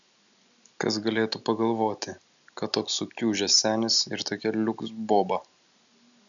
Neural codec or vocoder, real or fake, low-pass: none; real; 7.2 kHz